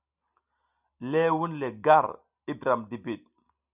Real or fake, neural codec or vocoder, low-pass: real; none; 3.6 kHz